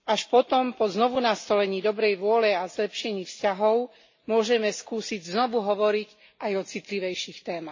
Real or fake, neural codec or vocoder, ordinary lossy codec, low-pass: real; none; MP3, 32 kbps; 7.2 kHz